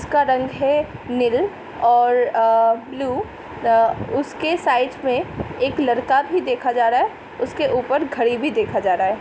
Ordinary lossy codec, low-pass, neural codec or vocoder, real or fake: none; none; none; real